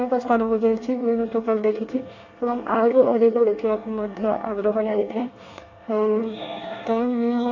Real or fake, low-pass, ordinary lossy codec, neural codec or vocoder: fake; 7.2 kHz; AAC, 48 kbps; codec, 24 kHz, 1 kbps, SNAC